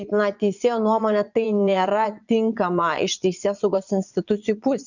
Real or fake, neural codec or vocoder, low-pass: fake; vocoder, 22.05 kHz, 80 mel bands, Vocos; 7.2 kHz